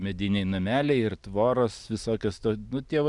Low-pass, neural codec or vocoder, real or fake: 10.8 kHz; none; real